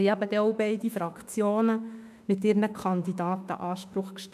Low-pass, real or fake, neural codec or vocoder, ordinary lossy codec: 14.4 kHz; fake; autoencoder, 48 kHz, 32 numbers a frame, DAC-VAE, trained on Japanese speech; none